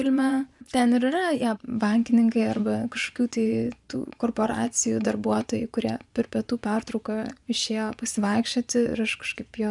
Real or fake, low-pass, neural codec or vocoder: fake; 10.8 kHz; vocoder, 24 kHz, 100 mel bands, Vocos